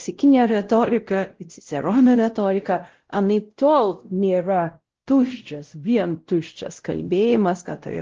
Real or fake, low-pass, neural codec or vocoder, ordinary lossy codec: fake; 7.2 kHz; codec, 16 kHz, 0.5 kbps, X-Codec, WavLM features, trained on Multilingual LibriSpeech; Opus, 16 kbps